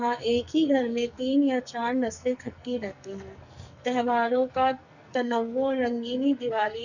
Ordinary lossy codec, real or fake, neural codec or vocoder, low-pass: none; fake; codec, 44.1 kHz, 2.6 kbps, SNAC; 7.2 kHz